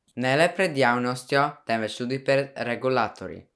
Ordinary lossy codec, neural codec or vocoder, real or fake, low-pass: none; none; real; none